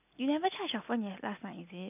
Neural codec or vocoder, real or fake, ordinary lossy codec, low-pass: none; real; none; 3.6 kHz